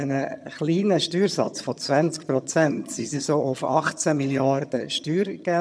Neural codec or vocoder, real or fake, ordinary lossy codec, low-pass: vocoder, 22.05 kHz, 80 mel bands, HiFi-GAN; fake; none; none